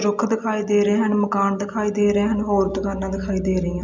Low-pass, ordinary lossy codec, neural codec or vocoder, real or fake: 7.2 kHz; none; none; real